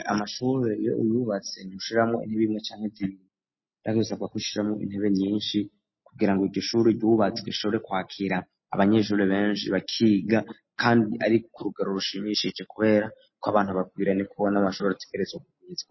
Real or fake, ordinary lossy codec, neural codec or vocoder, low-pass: real; MP3, 24 kbps; none; 7.2 kHz